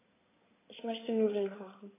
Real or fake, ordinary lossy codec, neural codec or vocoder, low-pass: fake; AAC, 16 kbps; codec, 16 kHz in and 24 kHz out, 2.2 kbps, FireRedTTS-2 codec; 3.6 kHz